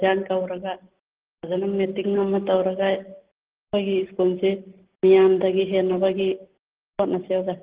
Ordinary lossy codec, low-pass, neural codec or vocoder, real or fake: Opus, 16 kbps; 3.6 kHz; none; real